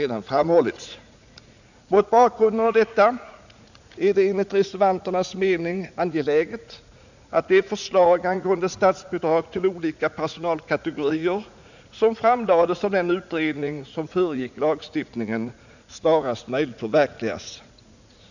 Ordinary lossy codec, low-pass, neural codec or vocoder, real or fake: none; 7.2 kHz; vocoder, 44.1 kHz, 80 mel bands, Vocos; fake